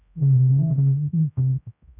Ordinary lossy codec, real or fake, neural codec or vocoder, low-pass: none; fake; codec, 16 kHz, 0.5 kbps, X-Codec, HuBERT features, trained on general audio; 3.6 kHz